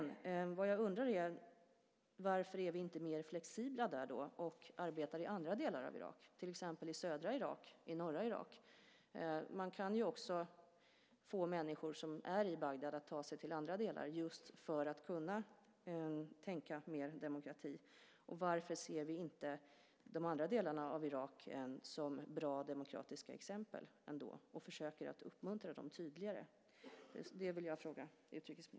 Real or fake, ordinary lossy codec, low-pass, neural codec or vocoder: real; none; none; none